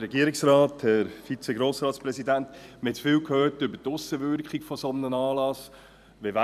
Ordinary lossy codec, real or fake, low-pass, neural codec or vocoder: none; fake; 14.4 kHz; vocoder, 44.1 kHz, 128 mel bands every 256 samples, BigVGAN v2